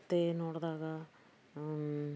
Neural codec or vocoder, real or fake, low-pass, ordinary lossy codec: none; real; none; none